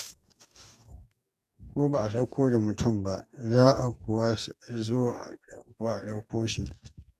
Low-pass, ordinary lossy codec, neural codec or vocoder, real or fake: 14.4 kHz; none; codec, 44.1 kHz, 2.6 kbps, DAC; fake